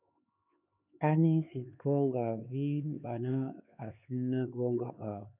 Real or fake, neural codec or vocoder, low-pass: fake; codec, 16 kHz, 4 kbps, X-Codec, HuBERT features, trained on LibriSpeech; 3.6 kHz